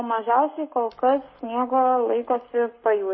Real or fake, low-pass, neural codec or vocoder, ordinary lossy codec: fake; 7.2 kHz; codec, 44.1 kHz, 7.8 kbps, Pupu-Codec; MP3, 24 kbps